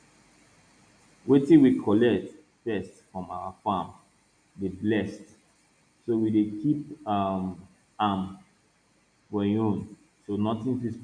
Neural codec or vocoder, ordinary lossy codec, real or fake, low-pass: none; none; real; 9.9 kHz